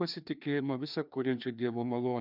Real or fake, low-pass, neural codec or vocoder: fake; 5.4 kHz; codec, 16 kHz, 2 kbps, FreqCodec, larger model